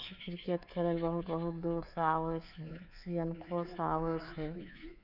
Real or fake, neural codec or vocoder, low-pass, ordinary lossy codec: fake; codec, 24 kHz, 3.1 kbps, DualCodec; 5.4 kHz; none